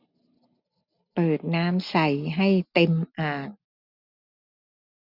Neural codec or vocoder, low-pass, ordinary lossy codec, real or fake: none; 5.4 kHz; none; real